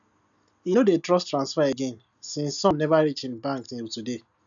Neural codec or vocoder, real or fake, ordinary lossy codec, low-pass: none; real; none; 7.2 kHz